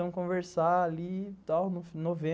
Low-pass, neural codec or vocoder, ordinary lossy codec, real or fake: none; none; none; real